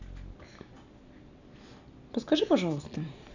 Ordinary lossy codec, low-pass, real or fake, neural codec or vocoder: none; 7.2 kHz; real; none